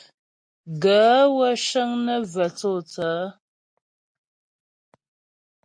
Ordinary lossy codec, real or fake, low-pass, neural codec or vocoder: MP3, 48 kbps; real; 9.9 kHz; none